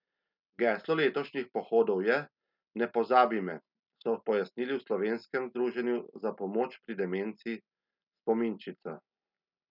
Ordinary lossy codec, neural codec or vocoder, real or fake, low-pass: none; none; real; 5.4 kHz